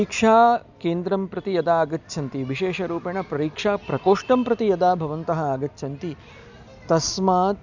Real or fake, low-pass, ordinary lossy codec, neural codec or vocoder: real; 7.2 kHz; none; none